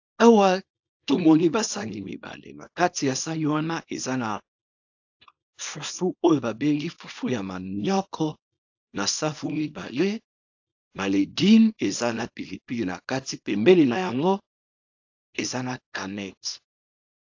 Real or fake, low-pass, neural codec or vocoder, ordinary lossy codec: fake; 7.2 kHz; codec, 24 kHz, 0.9 kbps, WavTokenizer, small release; AAC, 48 kbps